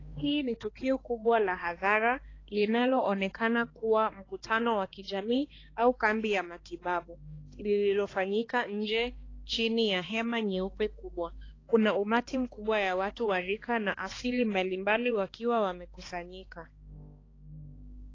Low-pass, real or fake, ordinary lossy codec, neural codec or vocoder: 7.2 kHz; fake; AAC, 32 kbps; codec, 16 kHz, 2 kbps, X-Codec, HuBERT features, trained on balanced general audio